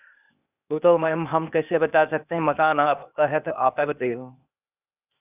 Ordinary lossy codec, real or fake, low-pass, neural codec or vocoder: none; fake; 3.6 kHz; codec, 16 kHz, 0.8 kbps, ZipCodec